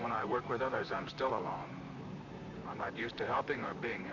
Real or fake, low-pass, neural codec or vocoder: fake; 7.2 kHz; vocoder, 44.1 kHz, 128 mel bands, Pupu-Vocoder